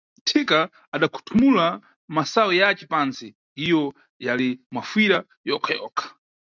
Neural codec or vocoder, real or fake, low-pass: none; real; 7.2 kHz